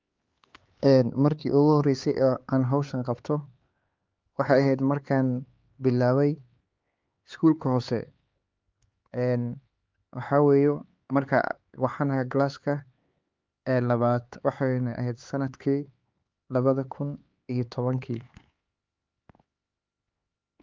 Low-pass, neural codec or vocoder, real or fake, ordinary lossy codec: 7.2 kHz; codec, 16 kHz, 4 kbps, X-Codec, HuBERT features, trained on LibriSpeech; fake; Opus, 24 kbps